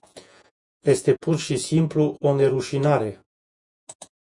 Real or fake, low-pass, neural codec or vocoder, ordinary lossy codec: fake; 10.8 kHz; vocoder, 48 kHz, 128 mel bands, Vocos; MP3, 96 kbps